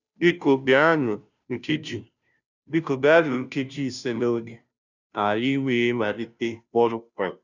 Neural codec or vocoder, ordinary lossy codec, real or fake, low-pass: codec, 16 kHz, 0.5 kbps, FunCodec, trained on Chinese and English, 25 frames a second; none; fake; 7.2 kHz